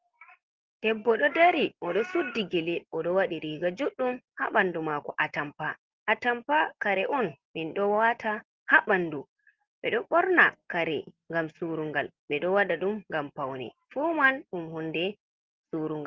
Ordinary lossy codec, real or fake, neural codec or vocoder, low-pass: Opus, 16 kbps; real; none; 7.2 kHz